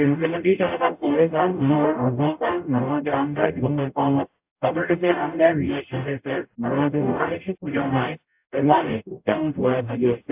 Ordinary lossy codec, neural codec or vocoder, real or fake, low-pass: AAC, 32 kbps; codec, 44.1 kHz, 0.9 kbps, DAC; fake; 3.6 kHz